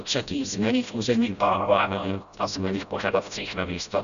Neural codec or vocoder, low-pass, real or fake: codec, 16 kHz, 0.5 kbps, FreqCodec, smaller model; 7.2 kHz; fake